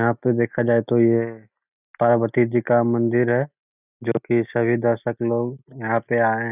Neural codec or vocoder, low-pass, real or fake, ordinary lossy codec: none; 3.6 kHz; real; none